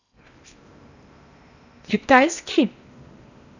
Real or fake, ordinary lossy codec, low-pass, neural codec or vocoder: fake; none; 7.2 kHz; codec, 16 kHz in and 24 kHz out, 0.8 kbps, FocalCodec, streaming, 65536 codes